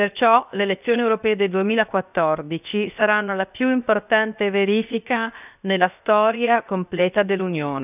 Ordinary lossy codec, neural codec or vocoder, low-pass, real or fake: none; codec, 16 kHz, 0.7 kbps, FocalCodec; 3.6 kHz; fake